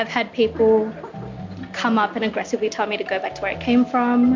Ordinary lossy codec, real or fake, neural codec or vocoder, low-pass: MP3, 64 kbps; real; none; 7.2 kHz